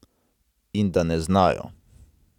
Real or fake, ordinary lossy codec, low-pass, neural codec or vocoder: real; none; 19.8 kHz; none